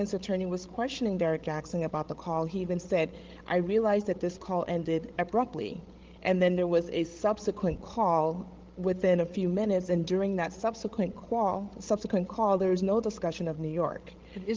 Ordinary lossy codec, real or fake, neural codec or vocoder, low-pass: Opus, 16 kbps; fake; codec, 16 kHz, 16 kbps, FreqCodec, larger model; 7.2 kHz